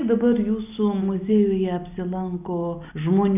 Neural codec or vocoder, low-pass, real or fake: none; 3.6 kHz; real